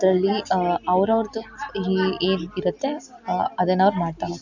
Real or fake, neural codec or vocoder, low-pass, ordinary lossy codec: real; none; 7.2 kHz; none